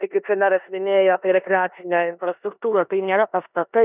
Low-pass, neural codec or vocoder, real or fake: 3.6 kHz; codec, 16 kHz in and 24 kHz out, 0.9 kbps, LongCat-Audio-Codec, four codebook decoder; fake